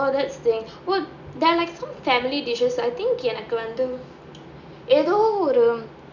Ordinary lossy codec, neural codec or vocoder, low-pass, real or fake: none; none; 7.2 kHz; real